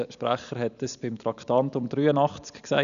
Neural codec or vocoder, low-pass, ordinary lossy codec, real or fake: none; 7.2 kHz; none; real